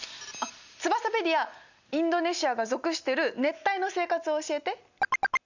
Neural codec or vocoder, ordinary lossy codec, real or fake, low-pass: none; none; real; 7.2 kHz